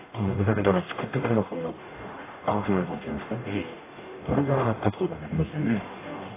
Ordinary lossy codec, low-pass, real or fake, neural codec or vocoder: AAC, 16 kbps; 3.6 kHz; fake; codec, 44.1 kHz, 0.9 kbps, DAC